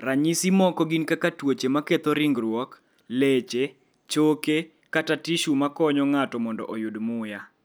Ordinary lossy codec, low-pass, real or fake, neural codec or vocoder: none; none; real; none